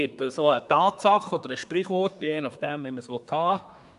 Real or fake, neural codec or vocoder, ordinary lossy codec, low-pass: fake; codec, 24 kHz, 1 kbps, SNAC; none; 10.8 kHz